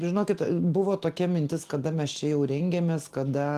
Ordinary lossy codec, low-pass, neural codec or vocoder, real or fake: Opus, 24 kbps; 14.4 kHz; none; real